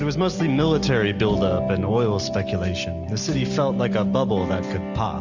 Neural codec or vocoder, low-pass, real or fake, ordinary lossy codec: none; 7.2 kHz; real; Opus, 64 kbps